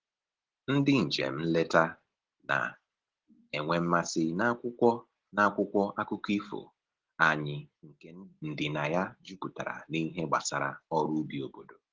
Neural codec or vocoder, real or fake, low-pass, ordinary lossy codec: none; real; 7.2 kHz; Opus, 16 kbps